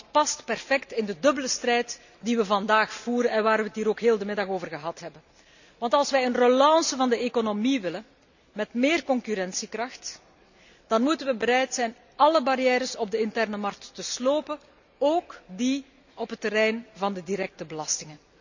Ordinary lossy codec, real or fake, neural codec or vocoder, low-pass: none; real; none; 7.2 kHz